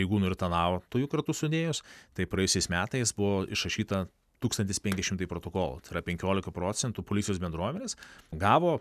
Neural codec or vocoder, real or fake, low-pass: none; real; 14.4 kHz